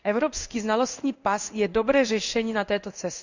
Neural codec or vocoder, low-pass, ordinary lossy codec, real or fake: codec, 16 kHz in and 24 kHz out, 1 kbps, XY-Tokenizer; 7.2 kHz; none; fake